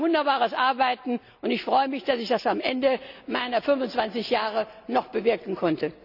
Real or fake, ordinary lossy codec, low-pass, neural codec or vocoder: real; none; 5.4 kHz; none